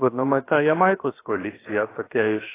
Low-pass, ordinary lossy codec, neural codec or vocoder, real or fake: 3.6 kHz; AAC, 16 kbps; codec, 16 kHz, 0.7 kbps, FocalCodec; fake